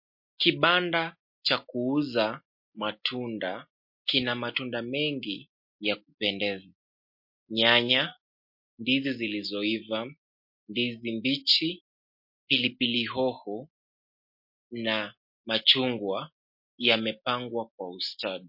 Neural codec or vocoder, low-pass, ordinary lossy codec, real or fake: none; 5.4 kHz; MP3, 32 kbps; real